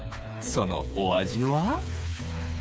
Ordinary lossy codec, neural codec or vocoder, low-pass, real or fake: none; codec, 16 kHz, 4 kbps, FreqCodec, smaller model; none; fake